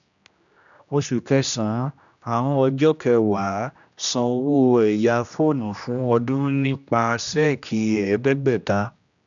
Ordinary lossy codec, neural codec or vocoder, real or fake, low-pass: none; codec, 16 kHz, 1 kbps, X-Codec, HuBERT features, trained on general audio; fake; 7.2 kHz